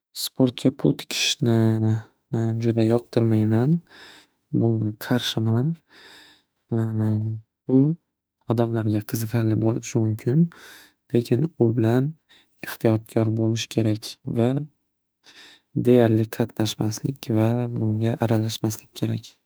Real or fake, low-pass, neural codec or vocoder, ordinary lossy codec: fake; none; autoencoder, 48 kHz, 32 numbers a frame, DAC-VAE, trained on Japanese speech; none